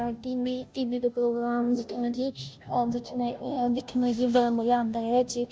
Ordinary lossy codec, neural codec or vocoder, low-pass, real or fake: none; codec, 16 kHz, 0.5 kbps, FunCodec, trained on Chinese and English, 25 frames a second; none; fake